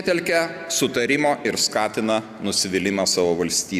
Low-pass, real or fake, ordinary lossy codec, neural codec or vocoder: 14.4 kHz; real; AAC, 96 kbps; none